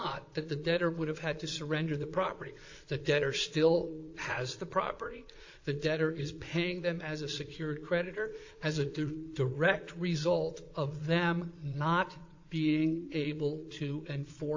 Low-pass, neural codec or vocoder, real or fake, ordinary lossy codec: 7.2 kHz; vocoder, 22.05 kHz, 80 mel bands, Vocos; fake; AAC, 48 kbps